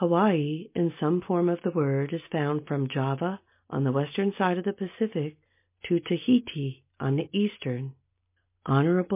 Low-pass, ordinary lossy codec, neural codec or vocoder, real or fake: 3.6 kHz; MP3, 24 kbps; none; real